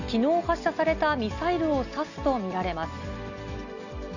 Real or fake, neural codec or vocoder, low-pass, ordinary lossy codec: real; none; 7.2 kHz; none